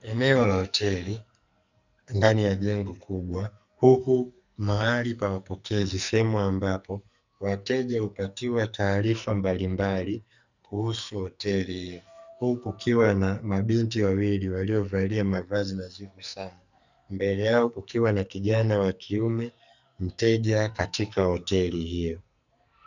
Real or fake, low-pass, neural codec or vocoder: fake; 7.2 kHz; codec, 44.1 kHz, 2.6 kbps, SNAC